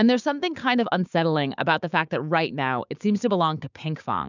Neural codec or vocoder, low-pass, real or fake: codec, 16 kHz, 6 kbps, DAC; 7.2 kHz; fake